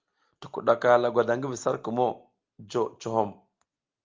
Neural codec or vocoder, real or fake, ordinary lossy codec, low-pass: none; real; Opus, 24 kbps; 7.2 kHz